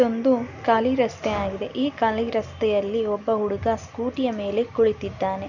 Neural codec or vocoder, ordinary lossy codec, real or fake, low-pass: none; none; real; 7.2 kHz